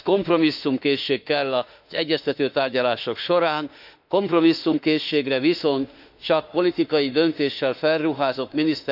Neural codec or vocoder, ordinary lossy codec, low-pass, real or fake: autoencoder, 48 kHz, 32 numbers a frame, DAC-VAE, trained on Japanese speech; none; 5.4 kHz; fake